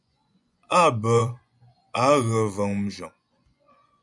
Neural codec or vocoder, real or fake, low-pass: vocoder, 24 kHz, 100 mel bands, Vocos; fake; 10.8 kHz